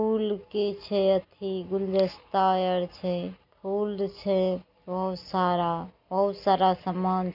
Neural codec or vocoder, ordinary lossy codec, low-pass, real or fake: none; none; 5.4 kHz; real